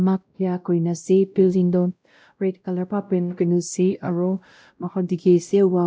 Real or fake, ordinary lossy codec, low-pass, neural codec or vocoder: fake; none; none; codec, 16 kHz, 0.5 kbps, X-Codec, WavLM features, trained on Multilingual LibriSpeech